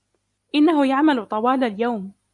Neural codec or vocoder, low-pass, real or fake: none; 10.8 kHz; real